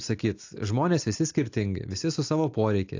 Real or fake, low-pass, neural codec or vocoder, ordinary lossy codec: real; 7.2 kHz; none; AAC, 48 kbps